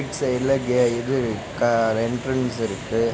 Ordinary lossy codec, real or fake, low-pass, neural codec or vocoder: none; real; none; none